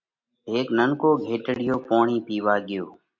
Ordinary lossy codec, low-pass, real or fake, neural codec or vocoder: MP3, 48 kbps; 7.2 kHz; real; none